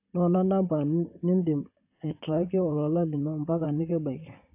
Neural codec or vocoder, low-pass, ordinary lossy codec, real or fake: vocoder, 44.1 kHz, 128 mel bands, Pupu-Vocoder; 3.6 kHz; AAC, 24 kbps; fake